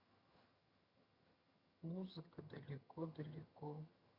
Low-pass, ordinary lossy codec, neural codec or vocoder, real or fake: 5.4 kHz; Opus, 64 kbps; vocoder, 22.05 kHz, 80 mel bands, HiFi-GAN; fake